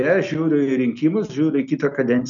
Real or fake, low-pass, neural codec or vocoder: real; 7.2 kHz; none